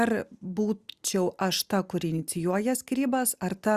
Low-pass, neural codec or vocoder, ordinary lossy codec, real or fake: 14.4 kHz; none; Opus, 64 kbps; real